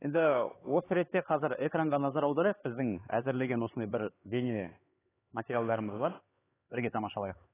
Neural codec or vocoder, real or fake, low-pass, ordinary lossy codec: codec, 16 kHz, 4 kbps, X-Codec, HuBERT features, trained on LibriSpeech; fake; 3.6 kHz; AAC, 16 kbps